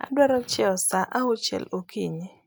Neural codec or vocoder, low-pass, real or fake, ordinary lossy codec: none; none; real; none